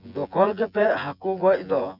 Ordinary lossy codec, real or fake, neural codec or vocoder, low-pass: none; fake; vocoder, 24 kHz, 100 mel bands, Vocos; 5.4 kHz